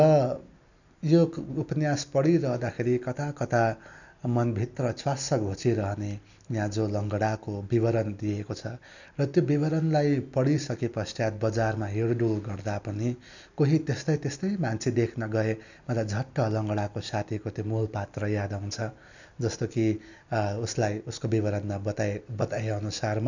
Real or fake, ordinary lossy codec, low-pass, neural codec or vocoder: real; none; 7.2 kHz; none